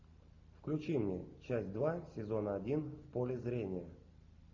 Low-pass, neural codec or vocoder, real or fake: 7.2 kHz; none; real